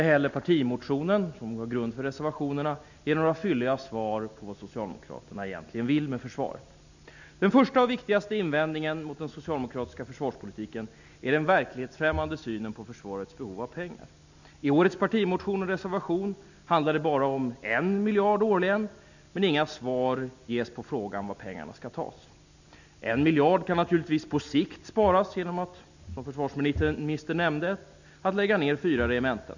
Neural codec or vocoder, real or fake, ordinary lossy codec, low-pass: none; real; none; 7.2 kHz